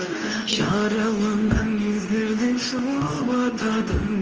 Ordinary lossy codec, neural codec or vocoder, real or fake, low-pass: Opus, 24 kbps; codec, 24 kHz, 0.9 kbps, WavTokenizer, medium speech release version 2; fake; 7.2 kHz